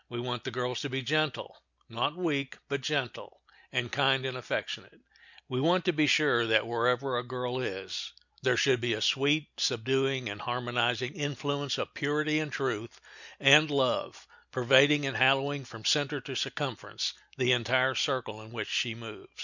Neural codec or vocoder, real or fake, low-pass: none; real; 7.2 kHz